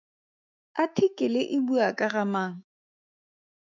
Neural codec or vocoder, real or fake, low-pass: autoencoder, 48 kHz, 128 numbers a frame, DAC-VAE, trained on Japanese speech; fake; 7.2 kHz